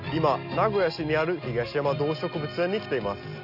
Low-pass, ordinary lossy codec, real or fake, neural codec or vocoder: 5.4 kHz; none; real; none